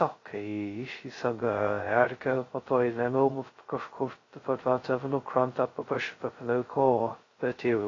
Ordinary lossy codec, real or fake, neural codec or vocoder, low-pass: AAC, 32 kbps; fake; codec, 16 kHz, 0.2 kbps, FocalCodec; 7.2 kHz